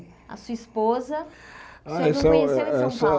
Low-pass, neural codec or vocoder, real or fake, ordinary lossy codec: none; none; real; none